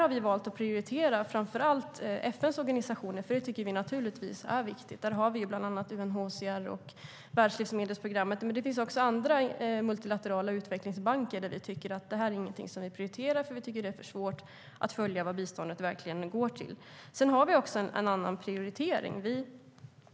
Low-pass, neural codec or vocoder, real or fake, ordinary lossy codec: none; none; real; none